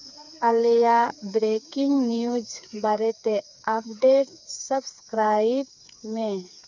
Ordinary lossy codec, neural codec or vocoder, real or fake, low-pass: none; codec, 16 kHz, 4 kbps, FreqCodec, smaller model; fake; 7.2 kHz